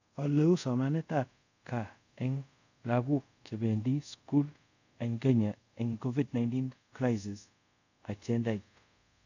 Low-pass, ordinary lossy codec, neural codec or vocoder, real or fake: 7.2 kHz; none; codec, 24 kHz, 0.5 kbps, DualCodec; fake